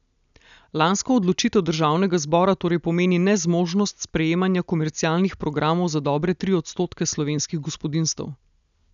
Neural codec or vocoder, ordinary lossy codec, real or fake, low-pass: none; none; real; 7.2 kHz